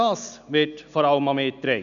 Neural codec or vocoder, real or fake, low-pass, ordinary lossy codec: none; real; 7.2 kHz; none